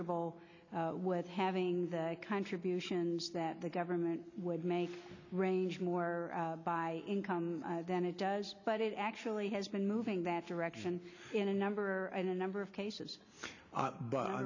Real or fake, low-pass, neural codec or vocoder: real; 7.2 kHz; none